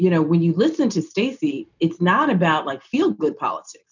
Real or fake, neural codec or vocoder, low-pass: real; none; 7.2 kHz